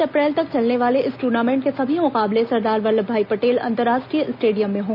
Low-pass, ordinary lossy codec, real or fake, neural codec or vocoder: 5.4 kHz; none; real; none